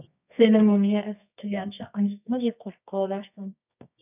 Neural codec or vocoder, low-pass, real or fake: codec, 24 kHz, 0.9 kbps, WavTokenizer, medium music audio release; 3.6 kHz; fake